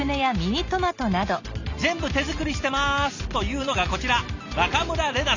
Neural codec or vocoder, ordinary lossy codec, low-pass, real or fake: none; Opus, 64 kbps; 7.2 kHz; real